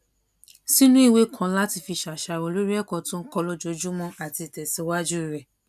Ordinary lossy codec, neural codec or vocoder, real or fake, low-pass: none; none; real; 14.4 kHz